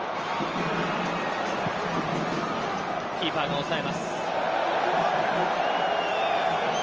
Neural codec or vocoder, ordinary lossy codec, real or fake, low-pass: none; Opus, 24 kbps; real; 7.2 kHz